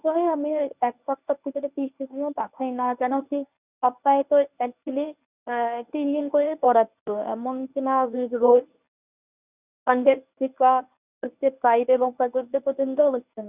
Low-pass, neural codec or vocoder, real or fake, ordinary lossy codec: 3.6 kHz; codec, 24 kHz, 0.9 kbps, WavTokenizer, medium speech release version 1; fake; none